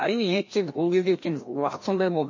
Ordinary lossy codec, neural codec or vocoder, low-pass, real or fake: MP3, 32 kbps; codec, 16 kHz, 1 kbps, FreqCodec, larger model; 7.2 kHz; fake